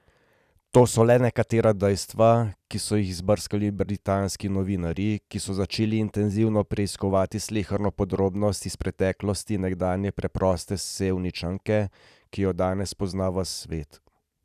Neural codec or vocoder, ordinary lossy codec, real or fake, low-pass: none; none; real; 14.4 kHz